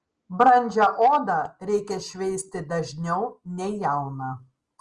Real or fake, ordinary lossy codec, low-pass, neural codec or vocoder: real; Opus, 24 kbps; 10.8 kHz; none